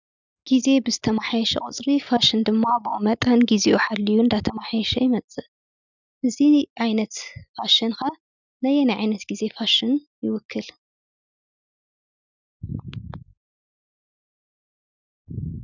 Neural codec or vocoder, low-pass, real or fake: none; 7.2 kHz; real